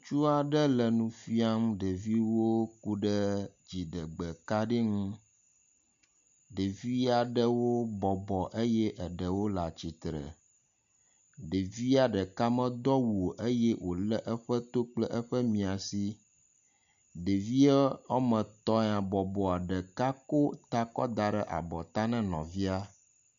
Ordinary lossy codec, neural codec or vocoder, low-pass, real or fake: MP3, 64 kbps; none; 7.2 kHz; real